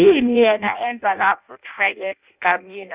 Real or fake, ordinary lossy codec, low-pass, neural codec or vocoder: fake; Opus, 64 kbps; 3.6 kHz; codec, 16 kHz in and 24 kHz out, 0.6 kbps, FireRedTTS-2 codec